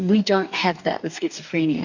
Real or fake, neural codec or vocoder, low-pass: fake; codec, 44.1 kHz, 2.6 kbps, DAC; 7.2 kHz